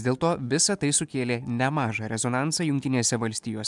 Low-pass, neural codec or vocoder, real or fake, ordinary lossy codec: 10.8 kHz; none; real; MP3, 96 kbps